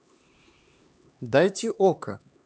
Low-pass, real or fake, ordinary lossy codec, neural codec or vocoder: none; fake; none; codec, 16 kHz, 2 kbps, X-Codec, HuBERT features, trained on LibriSpeech